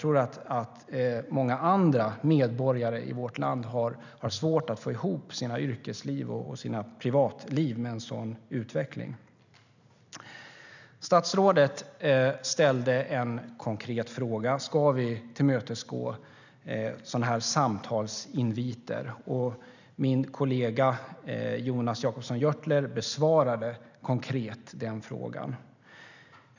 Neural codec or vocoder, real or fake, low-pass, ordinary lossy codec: none; real; 7.2 kHz; none